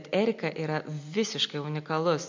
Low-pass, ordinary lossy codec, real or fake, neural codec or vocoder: 7.2 kHz; MP3, 64 kbps; real; none